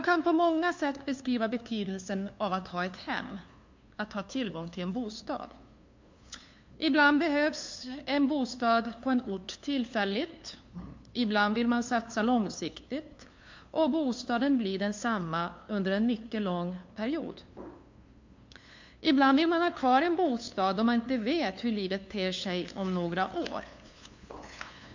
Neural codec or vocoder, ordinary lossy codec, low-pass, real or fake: codec, 16 kHz, 2 kbps, FunCodec, trained on LibriTTS, 25 frames a second; MP3, 48 kbps; 7.2 kHz; fake